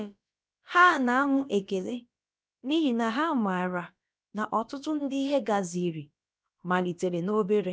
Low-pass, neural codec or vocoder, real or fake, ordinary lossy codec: none; codec, 16 kHz, about 1 kbps, DyCAST, with the encoder's durations; fake; none